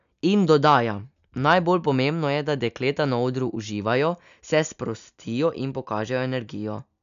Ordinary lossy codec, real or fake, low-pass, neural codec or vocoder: none; real; 7.2 kHz; none